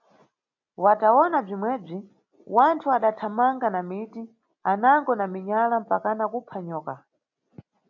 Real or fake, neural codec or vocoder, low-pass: real; none; 7.2 kHz